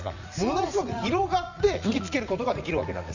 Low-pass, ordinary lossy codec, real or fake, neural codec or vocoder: 7.2 kHz; none; fake; vocoder, 44.1 kHz, 80 mel bands, Vocos